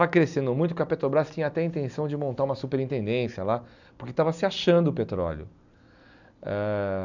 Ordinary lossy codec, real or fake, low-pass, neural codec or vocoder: none; real; 7.2 kHz; none